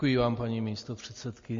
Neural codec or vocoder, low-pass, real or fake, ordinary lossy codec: none; 7.2 kHz; real; MP3, 32 kbps